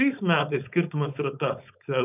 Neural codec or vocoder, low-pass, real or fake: codec, 16 kHz, 4.8 kbps, FACodec; 3.6 kHz; fake